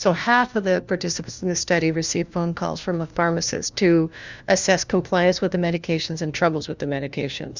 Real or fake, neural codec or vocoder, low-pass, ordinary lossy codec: fake; codec, 16 kHz, 1 kbps, FunCodec, trained on LibriTTS, 50 frames a second; 7.2 kHz; Opus, 64 kbps